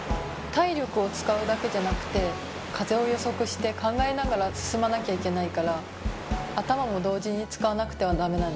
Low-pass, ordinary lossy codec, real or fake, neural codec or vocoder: none; none; real; none